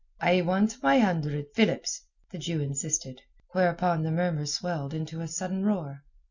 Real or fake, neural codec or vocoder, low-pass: real; none; 7.2 kHz